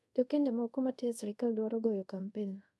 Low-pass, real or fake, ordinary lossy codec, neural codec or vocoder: none; fake; none; codec, 24 kHz, 0.5 kbps, DualCodec